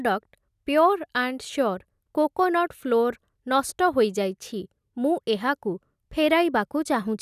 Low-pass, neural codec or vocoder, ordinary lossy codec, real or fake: 14.4 kHz; none; none; real